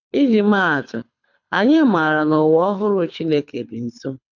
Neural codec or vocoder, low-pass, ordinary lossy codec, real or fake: codec, 24 kHz, 6 kbps, HILCodec; 7.2 kHz; none; fake